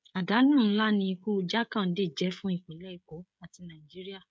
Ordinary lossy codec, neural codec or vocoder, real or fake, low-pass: none; codec, 16 kHz, 16 kbps, FreqCodec, smaller model; fake; none